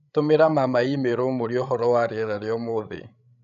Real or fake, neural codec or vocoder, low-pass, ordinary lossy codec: fake; codec, 16 kHz, 16 kbps, FreqCodec, larger model; 7.2 kHz; none